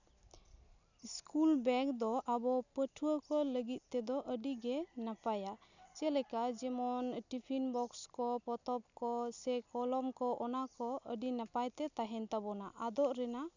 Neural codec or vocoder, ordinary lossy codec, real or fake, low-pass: none; none; real; 7.2 kHz